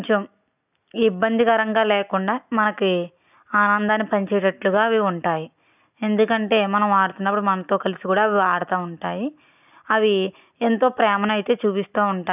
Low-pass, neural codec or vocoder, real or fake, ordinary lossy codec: 3.6 kHz; none; real; none